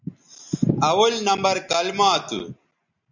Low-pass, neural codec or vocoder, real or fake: 7.2 kHz; none; real